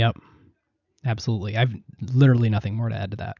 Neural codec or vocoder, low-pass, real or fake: none; 7.2 kHz; real